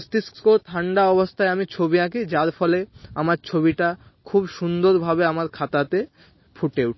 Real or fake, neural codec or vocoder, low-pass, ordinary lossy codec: real; none; 7.2 kHz; MP3, 24 kbps